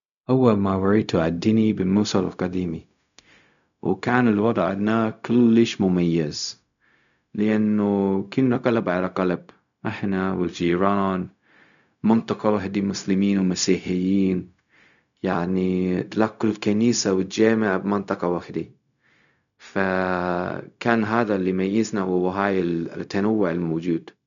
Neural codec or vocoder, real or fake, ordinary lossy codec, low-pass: codec, 16 kHz, 0.4 kbps, LongCat-Audio-Codec; fake; none; 7.2 kHz